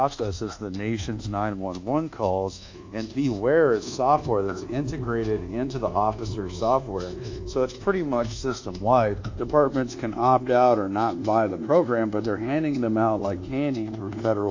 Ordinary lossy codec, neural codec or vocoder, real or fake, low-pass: AAC, 48 kbps; codec, 24 kHz, 1.2 kbps, DualCodec; fake; 7.2 kHz